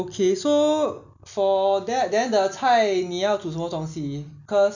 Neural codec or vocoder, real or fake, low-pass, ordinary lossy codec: none; real; 7.2 kHz; none